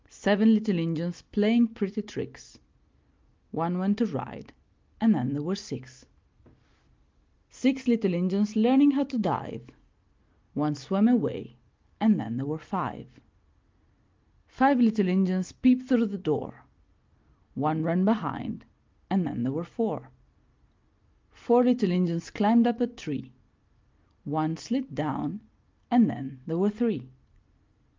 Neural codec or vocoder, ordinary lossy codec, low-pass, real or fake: none; Opus, 32 kbps; 7.2 kHz; real